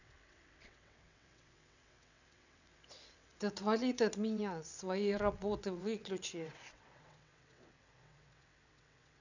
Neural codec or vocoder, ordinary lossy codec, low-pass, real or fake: vocoder, 22.05 kHz, 80 mel bands, WaveNeXt; none; 7.2 kHz; fake